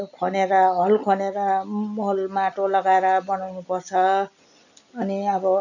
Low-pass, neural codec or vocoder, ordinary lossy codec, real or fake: 7.2 kHz; none; none; real